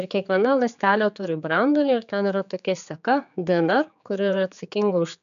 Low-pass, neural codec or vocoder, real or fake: 7.2 kHz; codec, 16 kHz, 4 kbps, X-Codec, HuBERT features, trained on general audio; fake